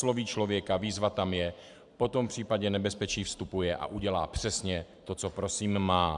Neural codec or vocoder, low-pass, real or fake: vocoder, 44.1 kHz, 128 mel bands every 512 samples, BigVGAN v2; 10.8 kHz; fake